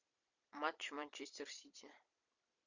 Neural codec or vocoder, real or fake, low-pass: vocoder, 44.1 kHz, 128 mel bands every 256 samples, BigVGAN v2; fake; 7.2 kHz